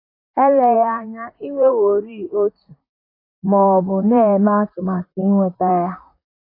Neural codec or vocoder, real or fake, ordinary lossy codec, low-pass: vocoder, 44.1 kHz, 128 mel bands, Pupu-Vocoder; fake; AAC, 32 kbps; 5.4 kHz